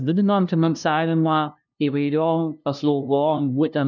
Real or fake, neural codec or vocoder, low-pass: fake; codec, 16 kHz, 0.5 kbps, FunCodec, trained on LibriTTS, 25 frames a second; 7.2 kHz